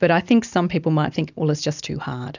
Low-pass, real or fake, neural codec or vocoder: 7.2 kHz; real; none